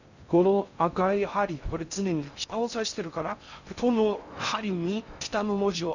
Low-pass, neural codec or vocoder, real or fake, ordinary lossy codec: 7.2 kHz; codec, 16 kHz in and 24 kHz out, 0.6 kbps, FocalCodec, streaming, 2048 codes; fake; none